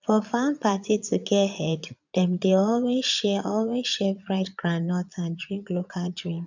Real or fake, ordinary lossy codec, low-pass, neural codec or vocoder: fake; none; 7.2 kHz; vocoder, 22.05 kHz, 80 mel bands, Vocos